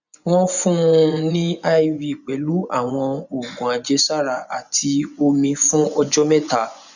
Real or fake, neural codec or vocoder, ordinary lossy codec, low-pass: fake; vocoder, 24 kHz, 100 mel bands, Vocos; none; 7.2 kHz